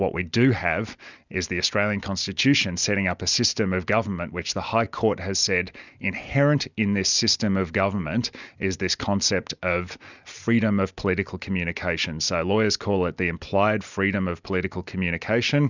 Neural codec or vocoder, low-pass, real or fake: none; 7.2 kHz; real